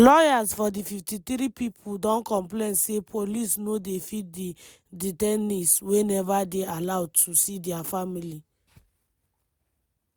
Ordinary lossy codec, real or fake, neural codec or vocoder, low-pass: none; real; none; none